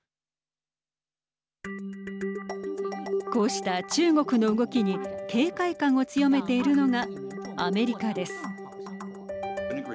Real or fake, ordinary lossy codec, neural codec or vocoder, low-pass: real; none; none; none